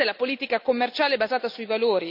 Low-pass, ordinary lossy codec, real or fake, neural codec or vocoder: 5.4 kHz; none; real; none